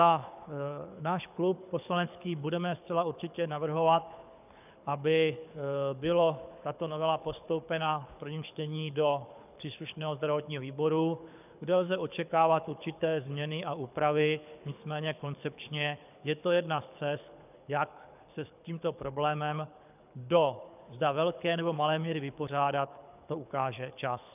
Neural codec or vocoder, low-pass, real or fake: codec, 24 kHz, 6 kbps, HILCodec; 3.6 kHz; fake